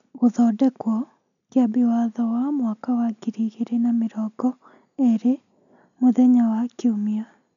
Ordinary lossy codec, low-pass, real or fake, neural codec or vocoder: none; 7.2 kHz; real; none